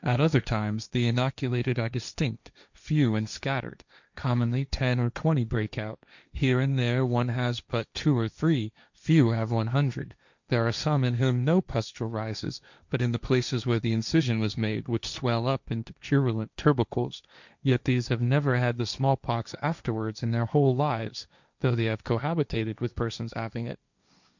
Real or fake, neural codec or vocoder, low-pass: fake; codec, 16 kHz, 1.1 kbps, Voila-Tokenizer; 7.2 kHz